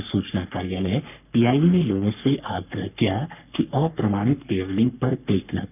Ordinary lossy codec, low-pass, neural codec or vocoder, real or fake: none; 3.6 kHz; codec, 44.1 kHz, 3.4 kbps, Pupu-Codec; fake